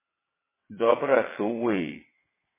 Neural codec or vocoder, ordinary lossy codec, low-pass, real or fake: vocoder, 22.05 kHz, 80 mel bands, WaveNeXt; MP3, 16 kbps; 3.6 kHz; fake